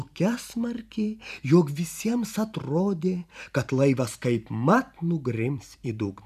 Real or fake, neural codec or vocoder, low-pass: fake; vocoder, 44.1 kHz, 128 mel bands every 512 samples, BigVGAN v2; 14.4 kHz